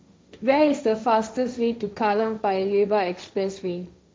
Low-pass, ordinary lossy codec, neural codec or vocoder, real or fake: none; none; codec, 16 kHz, 1.1 kbps, Voila-Tokenizer; fake